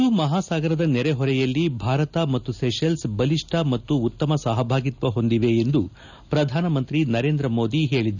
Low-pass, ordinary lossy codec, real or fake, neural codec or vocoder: 7.2 kHz; none; real; none